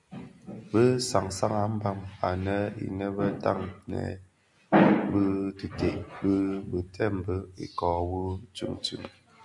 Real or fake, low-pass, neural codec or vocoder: real; 10.8 kHz; none